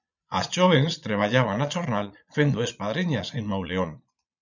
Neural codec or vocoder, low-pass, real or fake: vocoder, 22.05 kHz, 80 mel bands, Vocos; 7.2 kHz; fake